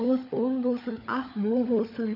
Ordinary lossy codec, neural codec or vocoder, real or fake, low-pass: none; codec, 16 kHz, 16 kbps, FunCodec, trained on LibriTTS, 50 frames a second; fake; 5.4 kHz